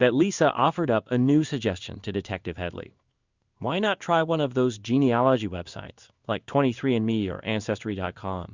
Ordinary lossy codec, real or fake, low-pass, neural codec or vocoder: Opus, 64 kbps; fake; 7.2 kHz; codec, 16 kHz in and 24 kHz out, 1 kbps, XY-Tokenizer